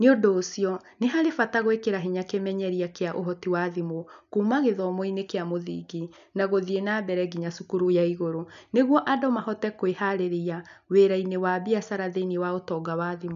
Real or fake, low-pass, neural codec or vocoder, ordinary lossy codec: real; 7.2 kHz; none; none